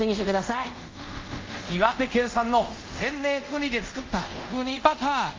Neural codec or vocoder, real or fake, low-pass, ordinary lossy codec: codec, 24 kHz, 0.5 kbps, DualCodec; fake; 7.2 kHz; Opus, 24 kbps